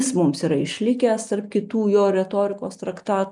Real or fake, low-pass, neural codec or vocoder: real; 10.8 kHz; none